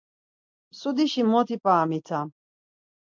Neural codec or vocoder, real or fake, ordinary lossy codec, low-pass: none; real; MP3, 64 kbps; 7.2 kHz